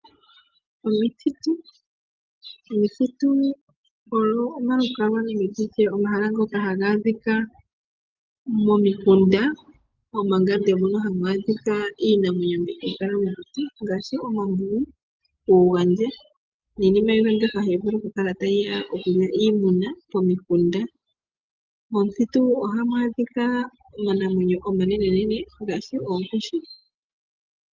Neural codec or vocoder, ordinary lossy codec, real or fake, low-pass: none; Opus, 32 kbps; real; 7.2 kHz